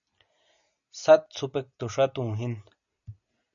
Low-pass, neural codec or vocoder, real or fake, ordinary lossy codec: 7.2 kHz; none; real; MP3, 64 kbps